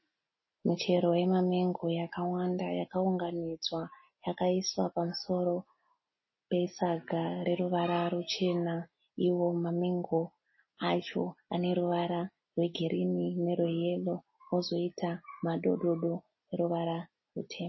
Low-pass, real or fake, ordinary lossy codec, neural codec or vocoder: 7.2 kHz; real; MP3, 24 kbps; none